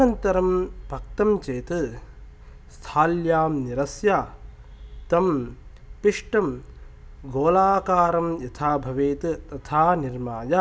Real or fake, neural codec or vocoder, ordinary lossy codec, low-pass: real; none; none; none